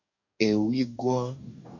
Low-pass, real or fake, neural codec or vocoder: 7.2 kHz; fake; codec, 44.1 kHz, 2.6 kbps, DAC